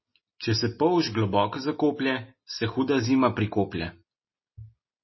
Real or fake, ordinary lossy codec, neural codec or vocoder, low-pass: real; MP3, 24 kbps; none; 7.2 kHz